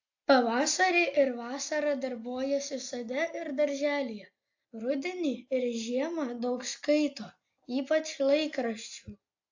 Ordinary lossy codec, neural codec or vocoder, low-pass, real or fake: AAC, 48 kbps; none; 7.2 kHz; real